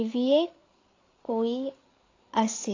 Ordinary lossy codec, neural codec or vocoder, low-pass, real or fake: AAC, 32 kbps; codec, 16 kHz, 8 kbps, FreqCodec, larger model; 7.2 kHz; fake